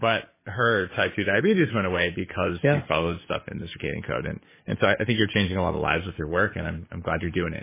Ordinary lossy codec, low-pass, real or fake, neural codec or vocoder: MP3, 16 kbps; 3.6 kHz; fake; codec, 44.1 kHz, 7.8 kbps, DAC